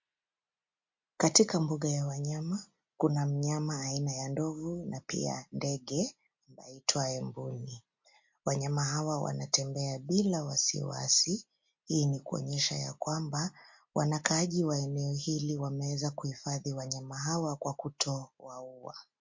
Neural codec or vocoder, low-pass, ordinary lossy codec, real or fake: none; 7.2 kHz; MP3, 48 kbps; real